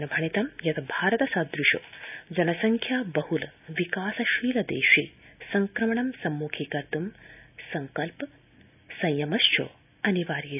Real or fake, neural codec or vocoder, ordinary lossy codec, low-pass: real; none; none; 3.6 kHz